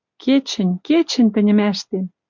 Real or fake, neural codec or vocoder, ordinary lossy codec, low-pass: real; none; MP3, 64 kbps; 7.2 kHz